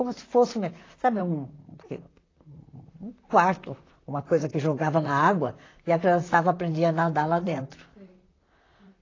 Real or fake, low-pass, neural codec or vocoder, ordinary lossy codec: fake; 7.2 kHz; vocoder, 44.1 kHz, 128 mel bands, Pupu-Vocoder; AAC, 32 kbps